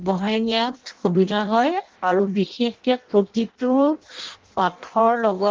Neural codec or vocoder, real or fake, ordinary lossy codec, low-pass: codec, 16 kHz in and 24 kHz out, 0.6 kbps, FireRedTTS-2 codec; fake; Opus, 16 kbps; 7.2 kHz